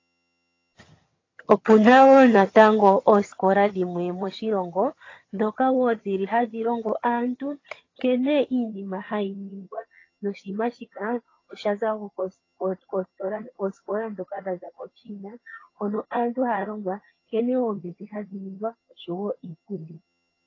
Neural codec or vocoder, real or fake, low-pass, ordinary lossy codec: vocoder, 22.05 kHz, 80 mel bands, HiFi-GAN; fake; 7.2 kHz; AAC, 32 kbps